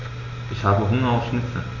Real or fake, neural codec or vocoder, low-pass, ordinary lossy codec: real; none; 7.2 kHz; none